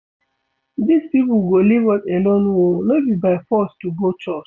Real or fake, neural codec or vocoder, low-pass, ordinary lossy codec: real; none; none; none